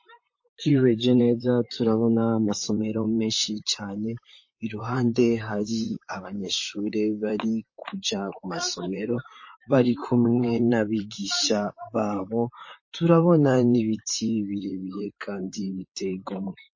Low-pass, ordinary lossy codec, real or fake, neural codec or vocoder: 7.2 kHz; MP3, 32 kbps; fake; vocoder, 44.1 kHz, 128 mel bands, Pupu-Vocoder